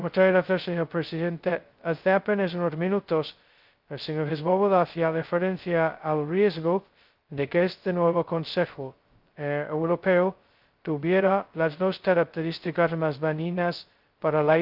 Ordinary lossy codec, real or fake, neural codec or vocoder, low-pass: Opus, 24 kbps; fake; codec, 16 kHz, 0.2 kbps, FocalCodec; 5.4 kHz